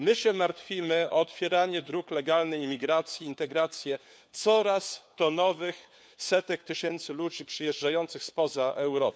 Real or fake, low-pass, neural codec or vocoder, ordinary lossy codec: fake; none; codec, 16 kHz, 4 kbps, FunCodec, trained on LibriTTS, 50 frames a second; none